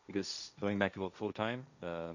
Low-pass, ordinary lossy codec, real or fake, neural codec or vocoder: 7.2 kHz; none; fake; codec, 16 kHz, 1.1 kbps, Voila-Tokenizer